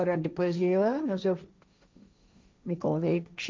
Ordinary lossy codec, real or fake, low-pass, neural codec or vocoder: none; fake; none; codec, 16 kHz, 1.1 kbps, Voila-Tokenizer